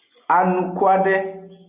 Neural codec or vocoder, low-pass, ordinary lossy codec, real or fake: none; 3.6 kHz; Opus, 64 kbps; real